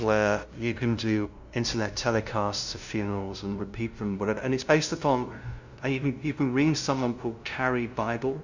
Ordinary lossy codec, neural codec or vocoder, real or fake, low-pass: Opus, 64 kbps; codec, 16 kHz, 0.5 kbps, FunCodec, trained on LibriTTS, 25 frames a second; fake; 7.2 kHz